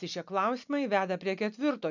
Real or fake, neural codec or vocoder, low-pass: real; none; 7.2 kHz